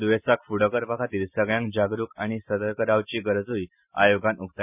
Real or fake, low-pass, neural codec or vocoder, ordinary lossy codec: real; 3.6 kHz; none; none